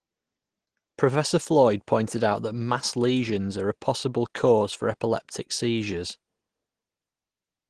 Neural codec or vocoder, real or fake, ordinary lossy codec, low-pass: none; real; Opus, 16 kbps; 9.9 kHz